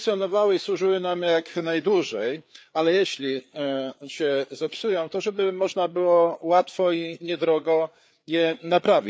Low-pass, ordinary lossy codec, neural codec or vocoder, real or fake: none; none; codec, 16 kHz, 4 kbps, FreqCodec, larger model; fake